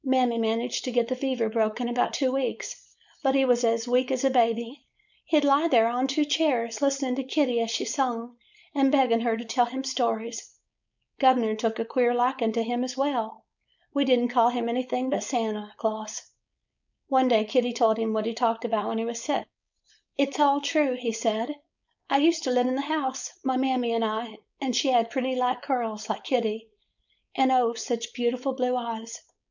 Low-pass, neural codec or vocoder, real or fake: 7.2 kHz; codec, 16 kHz, 4.8 kbps, FACodec; fake